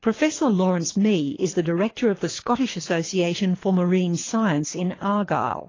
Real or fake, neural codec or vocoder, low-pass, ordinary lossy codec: fake; codec, 24 kHz, 3 kbps, HILCodec; 7.2 kHz; AAC, 32 kbps